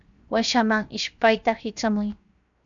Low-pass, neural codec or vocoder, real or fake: 7.2 kHz; codec, 16 kHz, 0.7 kbps, FocalCodec; fake